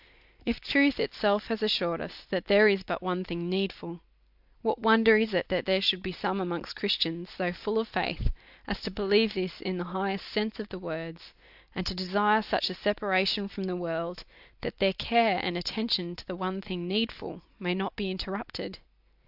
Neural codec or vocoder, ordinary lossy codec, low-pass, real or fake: none; AAC, 48 kbps; 5.4 kHz; real